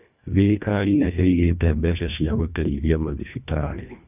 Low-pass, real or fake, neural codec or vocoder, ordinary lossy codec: 3.6 kHz; fake; codec, 24 kHz, 1.5 kbps, HILCodec; none